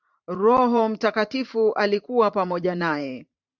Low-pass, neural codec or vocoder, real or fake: 7.2 kHz; vocoder, 44.1 kHz, 128 mel bands every 512 samples, BigVGAN v2; fake